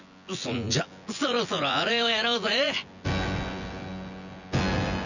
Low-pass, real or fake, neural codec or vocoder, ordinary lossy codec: 7.2 kHz; fake; vocoder, 24 kHz, 100 mel bands, Vocos; none